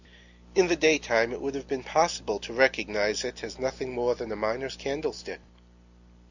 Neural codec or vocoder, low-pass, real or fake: none; 7.2 kHz; real